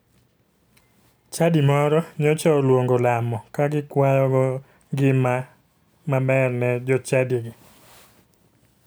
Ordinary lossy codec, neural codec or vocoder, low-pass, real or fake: none; none; none; real